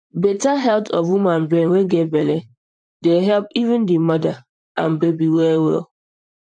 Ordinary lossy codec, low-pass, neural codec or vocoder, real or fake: none; 9.9 kHz; vocoder, 44.1 kHz, 128 mel bands, Pupu-Vocoder; fake